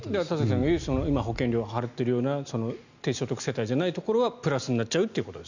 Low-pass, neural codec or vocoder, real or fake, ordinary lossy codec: 7.2 kHz; none; real; none